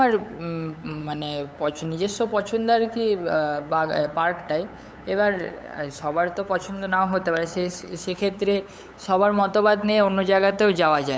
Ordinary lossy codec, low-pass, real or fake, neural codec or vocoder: none; none; fake; codec, 16 kHz, 8 kbps, FunCodec, trained on LibriTTS, 25 frames a second